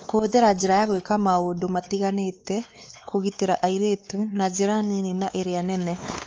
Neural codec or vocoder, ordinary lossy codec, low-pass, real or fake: codec, 16 kHz, 4 kbps, X-Codec, WavLM features, trained on Multilingual LibriSpeech; Opus, 24 kbps; 7.2 kHz; fake